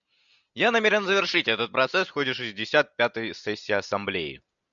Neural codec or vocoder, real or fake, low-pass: none; real; 7.2 kHz